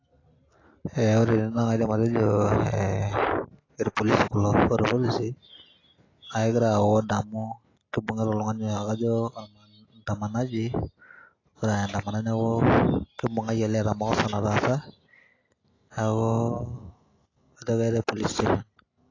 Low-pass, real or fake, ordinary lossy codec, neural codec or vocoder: 7.2 kHz; real; AAC, 32 kbps; none